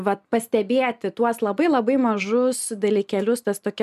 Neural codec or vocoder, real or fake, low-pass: none; real; 14.4 kHz